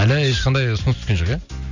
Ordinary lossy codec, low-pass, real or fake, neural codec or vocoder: none; 7.2 kHz; real; none